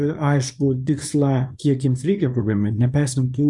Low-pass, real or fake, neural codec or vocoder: 10.8 kHz; fake; codec, 24 kHz, 0.9 kbps, WavTokenizer, small release